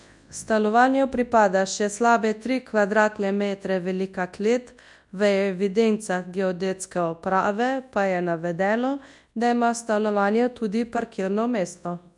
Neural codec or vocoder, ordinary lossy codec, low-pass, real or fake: codec, 24 kHz, 0.9 kbps, WavTokenizer, large speech release; none; 10.8 kHz; fake